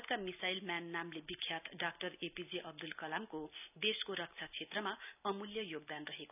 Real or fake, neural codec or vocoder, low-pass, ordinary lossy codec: real; none; 3.6 kHz; none